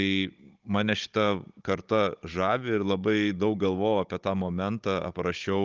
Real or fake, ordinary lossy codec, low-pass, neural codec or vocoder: fake; Opus, 32 kbps; 7.2 kHz; codec, 16 kHz, 16 kbps, FunCodec, trained on Chinese and English, 50 frames a second